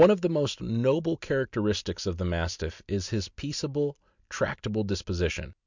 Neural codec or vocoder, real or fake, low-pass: none; real; 7.2 kHz